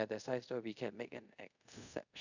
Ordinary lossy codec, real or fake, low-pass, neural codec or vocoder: none; fake; 7.2 kHz; codec, 24 kHz, 0.5 kbps, DualCodec